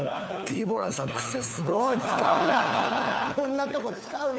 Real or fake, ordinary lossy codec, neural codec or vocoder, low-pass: fake; none; codec, 16 kHz, 4 kbps, FunCodec, trained on LibriTTS, 50 frames a second; none